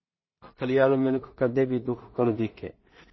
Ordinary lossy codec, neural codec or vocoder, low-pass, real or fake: MP3, 24 kbps; codec, 16 kHz in and 24 kHz out, 0.4 kbps, LongCat-Audio-Codec, two codebook decoder; 7.2 kHz; fake